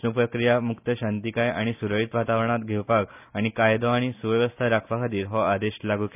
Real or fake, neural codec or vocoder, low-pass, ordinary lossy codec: real; none; 3.6 kHz; none